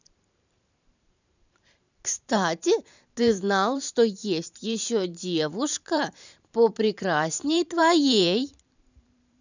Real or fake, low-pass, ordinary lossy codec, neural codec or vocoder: fake; 7.2 kHz; none; vocoder, 44.1 kHz, 128 mel bands every 512 samples, BigVGAN v2